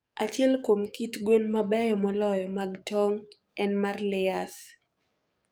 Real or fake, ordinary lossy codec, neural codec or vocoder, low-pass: fake; none; codec, 44.1 kHz, 7.8 kbps, DAC; none